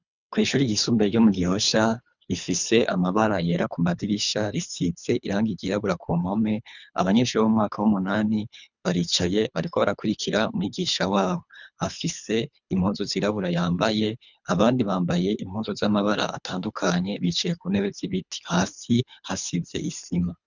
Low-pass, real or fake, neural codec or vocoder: 7.2 kHz; fake; codec, 24 kHz, 3 kbps, HILCodec